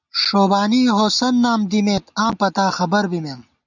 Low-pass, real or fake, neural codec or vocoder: 7.2 kHz; real; none